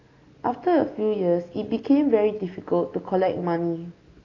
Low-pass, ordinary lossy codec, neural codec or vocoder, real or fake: 7.2 kHz; AAC, 32 kbps; none; real